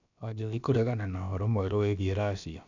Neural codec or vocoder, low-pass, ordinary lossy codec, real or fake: codec, 16 kHz, about 1 kbps, DyCAST, with the encoder's durations; 7.2 kHz; none; fake